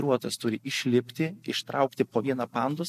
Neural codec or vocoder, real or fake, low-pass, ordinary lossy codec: none; real; 14.4 kHz; MP3, 64 kbps